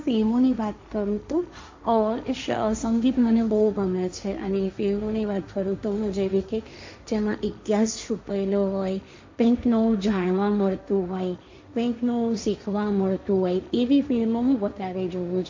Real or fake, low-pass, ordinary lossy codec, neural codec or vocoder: fake; 7.2 kHz; MP3, 64 kbps; codec, 16 kHz, 1.1 kbps, Voila-Tokenizer